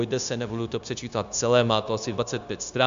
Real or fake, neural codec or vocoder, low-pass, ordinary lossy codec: fake; codec, 16 kHz, 0.9 kbps, LongCat-Audio-Codec; 7.2 kHz; AAC, 96 kbps